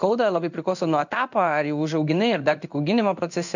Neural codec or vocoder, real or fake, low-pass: codec, 16 kHz in and 24 kHz out, 1 kbps, XY-Tokenizer; fake; 7.2 kHz